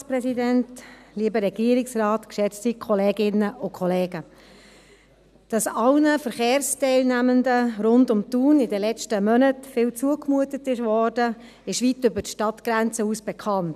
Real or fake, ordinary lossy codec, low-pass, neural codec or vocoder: real; none; 14.4 kHz; none